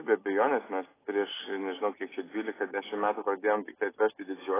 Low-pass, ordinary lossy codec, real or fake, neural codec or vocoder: 3.6 kHz; AAC, 16 kbps; real; none